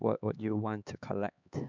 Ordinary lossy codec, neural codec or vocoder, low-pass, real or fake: none; codec, 16 kHz, 4 kbps, X-Codec, HuBERT features, trained on LibriSpeech; 7.2 kHz; fake